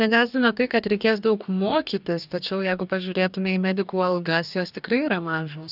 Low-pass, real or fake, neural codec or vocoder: 5.4 kHz; fake; codec, 44.1 kHz, 2.6 kbps, DAC